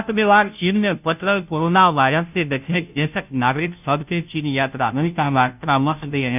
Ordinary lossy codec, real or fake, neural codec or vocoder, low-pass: none; fake; codec, 16 kHz, 0.5 kbps, FunCodec, trained on Chinese and English, 25 frames a second; 3.6 kHz